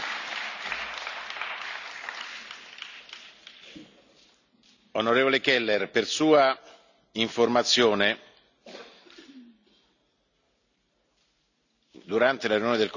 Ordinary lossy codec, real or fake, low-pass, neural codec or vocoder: none; real; 7.2 kHz; none